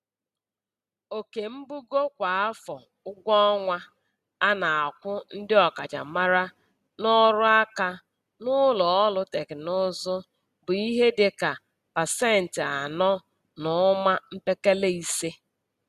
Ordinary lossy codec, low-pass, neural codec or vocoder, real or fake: Opus, 64 kbps; 14.4 kHz; none; real